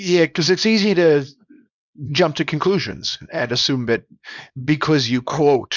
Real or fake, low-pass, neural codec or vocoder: fake; 7.2 kHz; codec, 24 kHz, 0.9 kbps, WavTokenizer, small release